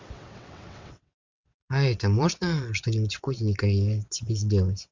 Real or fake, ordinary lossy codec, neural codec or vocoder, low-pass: fake; MP3, 64 kbps; vocoder, 22.05 kHz, 80 mel bands, WaveNeXt; 7.2 kHz